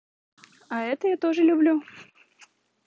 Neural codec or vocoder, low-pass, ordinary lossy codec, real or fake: none; none; none; real